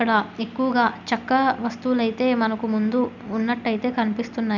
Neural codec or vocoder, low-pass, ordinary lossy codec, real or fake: none; 7.2 kHz; none; real